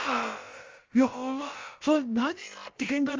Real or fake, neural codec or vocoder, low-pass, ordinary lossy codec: fake; codec, 16 kHz, about 1 kbps, DyCAST, with the encoder's durations; 7.2 kHz; Opus, 32 kbps